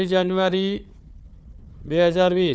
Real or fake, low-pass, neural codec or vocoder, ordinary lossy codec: fake; none; codec, 16 kHz, 4 kbps, FunCodec, trained on Chinese and English, 50 frames a second; none